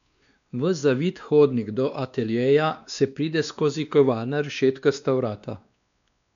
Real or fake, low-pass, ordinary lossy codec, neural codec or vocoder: fake; 7.2 kHz; none; codec, 16 kHz, 2 kbps, X-Codec, WavLM features, trained on Multilingual LibriSpeech